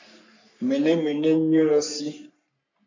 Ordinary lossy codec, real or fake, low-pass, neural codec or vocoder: MP3, 64 kbps; fake; 7.2 kHz; codec, 44.1 kHz, 3.4 kbps, Pupu-Codec